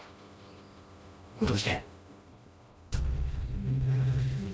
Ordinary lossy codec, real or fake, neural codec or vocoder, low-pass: none; fake; codec, 16 kHz, 1 kbps, FreqCodec, smaller model; none